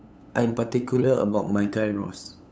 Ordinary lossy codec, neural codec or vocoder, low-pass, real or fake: none; codec, 16 kHz, 8 kbps, FunCodec, trained on LibriTTS, 25 frames a second; none; fake